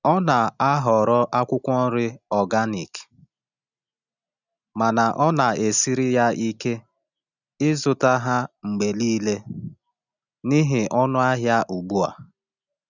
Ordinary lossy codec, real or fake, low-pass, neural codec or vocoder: none; real; 7.2 kHz; none